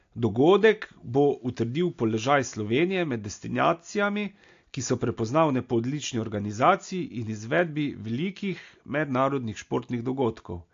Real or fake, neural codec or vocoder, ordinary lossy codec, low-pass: real; none; AAC, 48 kbps; 7.2 kHz